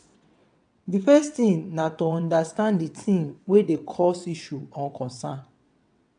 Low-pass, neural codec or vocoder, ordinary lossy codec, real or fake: 9.9 kHz; vocoder, 22.05 kHz, 80 mel bands, WaveNeXt; AAC, 64 kbps; fake